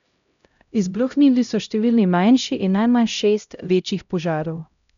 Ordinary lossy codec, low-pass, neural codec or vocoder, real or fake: none; 7.2 kHz; codec, 16 kHz, 0.5 kbps, X-Codec, HuBERT features, trained on LibriSpeech; fake